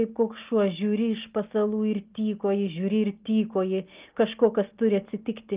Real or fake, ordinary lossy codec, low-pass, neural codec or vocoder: real; Opus, 32 kbps; 3.6 kHz; none